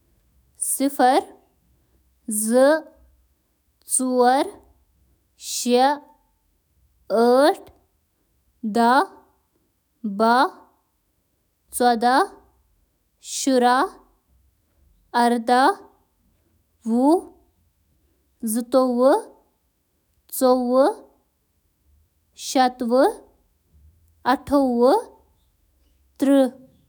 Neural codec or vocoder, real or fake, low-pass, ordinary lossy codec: autoencoder, 48 kHz, 128 numbers a frame, DAC-VAE, trained on Japanese speech; fake; none; none